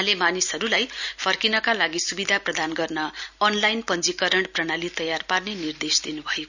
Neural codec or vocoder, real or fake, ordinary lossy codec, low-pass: none; real; none; 7.2 kHz